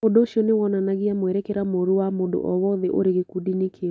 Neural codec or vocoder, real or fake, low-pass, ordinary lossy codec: none; real; none; none